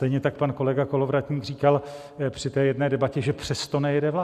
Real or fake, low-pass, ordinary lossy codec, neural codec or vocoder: real; 14.4 kHz; Opus, 64 kbps; none